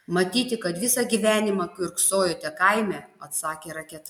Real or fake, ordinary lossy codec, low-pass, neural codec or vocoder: real; MP3, 96 kbps; 19.8 kHz; none